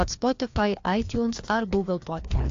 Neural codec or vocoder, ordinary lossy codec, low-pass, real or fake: codec, 16 kHz, 2 kbps, FreqCodec, larger model; AAC, 48 kbps; 7.2 kHz; fake